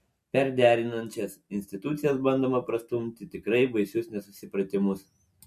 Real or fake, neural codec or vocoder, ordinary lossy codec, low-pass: real; none; MP3, 64 kbps; 14.4 kHz